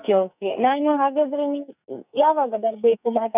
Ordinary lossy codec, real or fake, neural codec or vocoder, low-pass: none; fake; codec, 44.1 kHz, 2.6 kbps, SNAC; 3.6 kHz